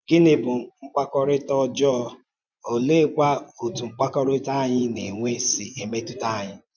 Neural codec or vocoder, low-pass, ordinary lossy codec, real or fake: vocoder, 24 kHz, 100 mel bands, Vocos; 7.2 kHz; none; fake